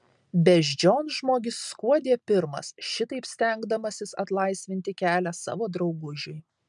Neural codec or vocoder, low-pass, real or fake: none; 10.8 kHz; real